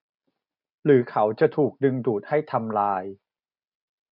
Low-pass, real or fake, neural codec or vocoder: 5.4 kHz; real; none